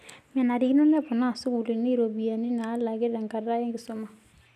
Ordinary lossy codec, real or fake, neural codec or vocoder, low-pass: none; real; none; 14.4 kHz